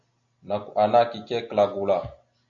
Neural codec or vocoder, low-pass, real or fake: none; 7.2 kHz; real